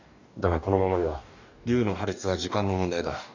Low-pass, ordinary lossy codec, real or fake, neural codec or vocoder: 7.2 kHz; none; fake; codec, 44.1 kHz, 2.6 kbps, DAC